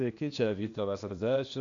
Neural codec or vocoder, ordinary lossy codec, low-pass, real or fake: codec, 16 kHz, 0.8 kbps, ZipCodec; AAC, 48 kbps; 7.2 kHz; fake